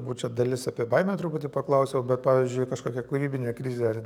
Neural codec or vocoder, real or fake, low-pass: codec, 44.1 kHz, 7.8 kbps, DAC; fake; 19.8 kHz